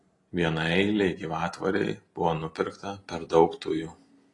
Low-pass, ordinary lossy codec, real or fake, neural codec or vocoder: 10.8 kHz; AAC, 32 kbps; real; none